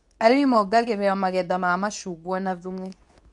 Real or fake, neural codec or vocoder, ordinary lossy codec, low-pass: fake; codec, 24 kHz, 0.9 kbps, WavTokenizer, medium speech release version 2; none; 10.8 kHz